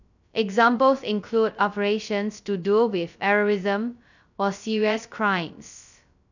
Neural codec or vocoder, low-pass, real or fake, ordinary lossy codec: codec, 16 kHz, 0.2 kbps, FocalCodec; 7.2 kHz; fake; none